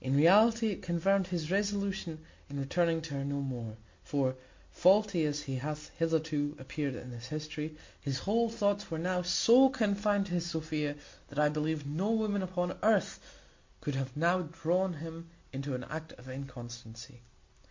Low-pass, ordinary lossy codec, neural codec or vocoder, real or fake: 7.2 kHz; MP3, 48 kbps; none; real